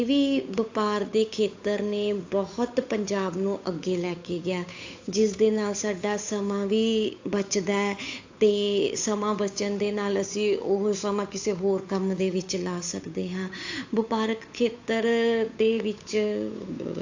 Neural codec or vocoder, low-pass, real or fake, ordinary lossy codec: codec, 16 kHz, 8 kbps, FunCodec, trained on Chinese and English, 25 frames a second; 7.2 kHz; fake; AAC, 48 kbps